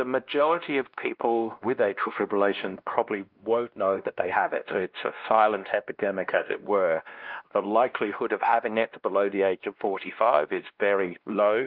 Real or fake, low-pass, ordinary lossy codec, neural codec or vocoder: fake; 5.4 kHz; Opus, 32 kbps; codec, 16 kHz, 1 kbps, X-Codec, WavLM features, trained on Multilingual LibriSpeech